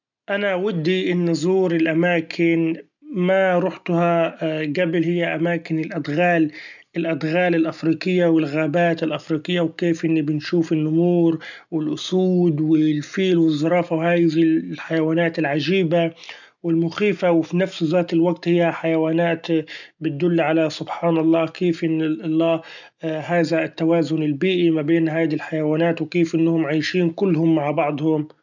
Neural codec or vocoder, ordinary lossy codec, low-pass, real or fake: none; none; 7.2 kHz; real